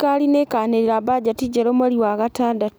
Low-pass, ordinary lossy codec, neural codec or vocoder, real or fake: none; none; none; real